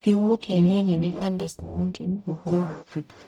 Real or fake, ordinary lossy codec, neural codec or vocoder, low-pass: fake; none; codec, 44.1 kHz, 0.9 kbps, DAC; 19.8 kHz